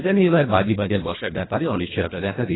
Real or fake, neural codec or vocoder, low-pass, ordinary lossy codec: fake; codec, 24 kHz, 1.5 kbps, HILCodec; 7.2 kHz; AAC, 16 kbps